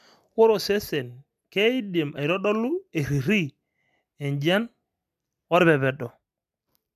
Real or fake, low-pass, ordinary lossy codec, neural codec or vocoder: real; 14.4 kHz; MP3, 96 kbps; none